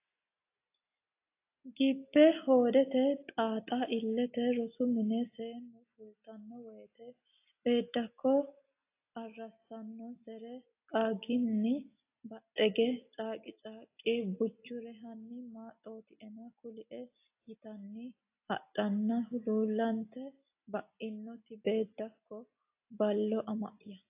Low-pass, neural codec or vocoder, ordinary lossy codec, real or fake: 3.6 kHz; none; AAC, 24 kbps; real